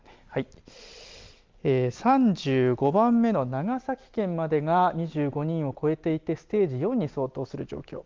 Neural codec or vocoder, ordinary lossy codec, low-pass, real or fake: none; Opus, 32 kbps; 7.2 kHz; real